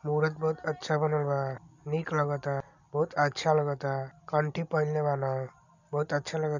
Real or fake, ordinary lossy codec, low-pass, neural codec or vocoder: real; none; 7.2 kHz; none